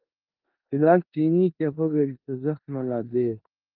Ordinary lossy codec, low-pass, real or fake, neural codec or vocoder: Opus, 24 kbps; 5.4 kHz; fake; codec, 16 kHz in and 24 kHz out, 0.9 kbps, LongCat-Audio-Codec, four codebook decoder